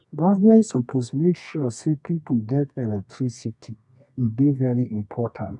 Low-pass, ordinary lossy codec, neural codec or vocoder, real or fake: 10.8 kHz; none; codec, 24 kHz, 0.9 kbps, WavTokenizer, medium music audio release; fake